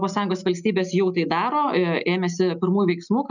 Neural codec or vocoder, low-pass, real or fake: none; 7.2 kHz; real